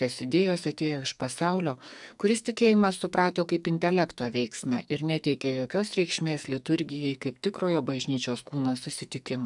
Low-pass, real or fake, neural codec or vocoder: 10.8 kHz; fake; codec, 44.1 kHz, 2.6 kbps, SNAC